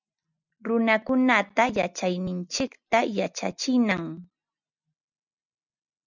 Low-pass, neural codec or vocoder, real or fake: 7.2 kHz; none; real